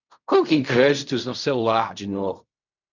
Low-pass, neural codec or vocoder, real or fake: 7.2 kHz; codec, 16 kHz in and 24 kHz out, 0.4 kbps, LongCat-Audio-Codec, fine tuned four codebook decoder; fake